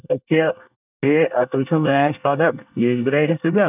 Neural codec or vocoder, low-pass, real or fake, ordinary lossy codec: codec, 24 kHz, 1 kbps, SNAC; 3.6 kHz; fake; none